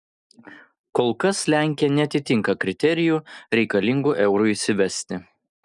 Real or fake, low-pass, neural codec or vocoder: real; 10.8 kHz; none